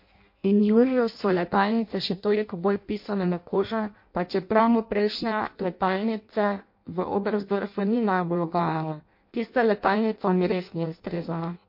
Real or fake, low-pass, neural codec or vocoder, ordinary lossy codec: fake; 5.4 kHz; codec, 16 kHz in and 24 kHz out, 0.6 kbps, FireRedTTS-2 codec; MP3, 32 kbps